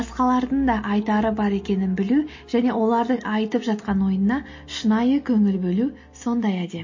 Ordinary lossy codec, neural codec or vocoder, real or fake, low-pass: MP3, 32 kbps; none; real; 7.2 kHz